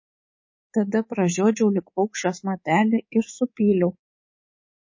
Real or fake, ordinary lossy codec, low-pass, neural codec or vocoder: fake; MP3, 32 kbps; 7.2 kHz; codec, 24 kHz, 3.1 kbps, DualCodec